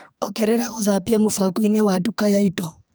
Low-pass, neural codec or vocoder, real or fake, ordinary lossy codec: none; codec, 44.1 kHz, 2.6 kbps, SNAC; fake; none